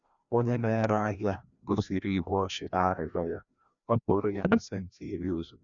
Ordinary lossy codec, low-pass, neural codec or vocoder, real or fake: none; 7.2 kHz; codec, 16 kHz, 1 kbps, FreqCodec, larger model; fake